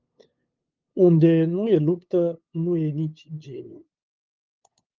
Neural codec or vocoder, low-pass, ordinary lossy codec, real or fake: codec, 16 kHz, 8 kbps, FunCodec, trained on LibriTTS, 25 frames a second; 7.2 kHz; Opus, 32 kbps; fake